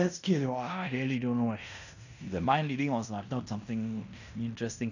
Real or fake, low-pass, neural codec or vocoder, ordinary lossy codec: fake; 7.2 kHz; codec, 16 kHz in and 24 kHz out, 0.9 kbps, LongCat-Audio-Codec, fine tuned four codebook decoder; none